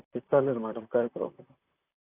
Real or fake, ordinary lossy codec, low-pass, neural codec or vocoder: fake; AAC, 32 kbps; 3.6 kHz; vocoder, 44.1 kHz, 128 mel bands, Pupu-Vocoder